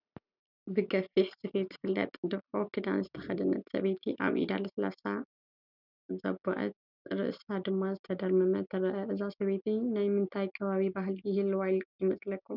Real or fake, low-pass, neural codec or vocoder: real; 5.4 kHz; none